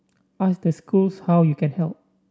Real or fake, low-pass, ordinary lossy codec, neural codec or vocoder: real; none; none; none